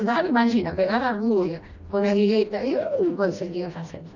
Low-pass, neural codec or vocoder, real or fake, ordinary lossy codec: 7.2 kHz; codec, 16 kHz, 1 kbps, FreqCodec, smaller model; fake; none